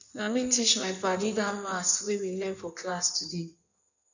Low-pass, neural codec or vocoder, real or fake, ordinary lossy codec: 7.2 kHz; codec, 16 kHz in and 24 kHz out, 1.1 kbps, FireRedTTS-2 codec; fake; none